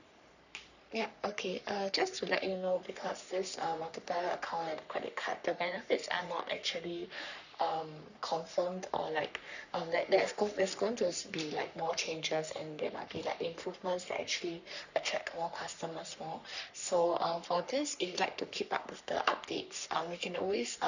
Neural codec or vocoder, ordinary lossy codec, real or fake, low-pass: codec, 44.1 kHz, 3.4 kbps, Pupu-Codec; none; fake; 7.2 kHz